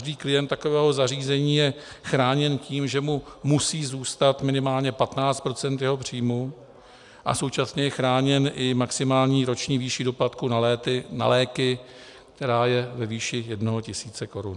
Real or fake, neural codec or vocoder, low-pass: real; none; 10.8 kHz